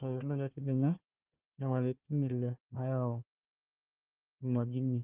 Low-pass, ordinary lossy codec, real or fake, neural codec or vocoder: 3.6 kHz; Opus, 32 kbps; fake; codec, 16 kHz, 1 kbps, FunCodec, trained on Chinese and English, 50 frames a second